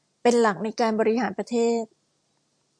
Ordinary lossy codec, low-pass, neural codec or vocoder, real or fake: AAC, 64 kbps; 9.9 kHz; none; real